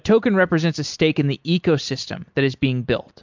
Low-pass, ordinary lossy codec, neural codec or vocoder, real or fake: 7.2 kHz; MP3, 64 kbps; none; real